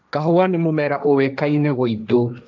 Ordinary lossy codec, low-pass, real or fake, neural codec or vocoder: none; none; fake; codec, 16 kHz, 1.1 kbps, Voila-Tokenizer